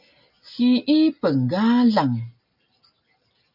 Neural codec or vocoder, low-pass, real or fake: none; 5.4 kHz; real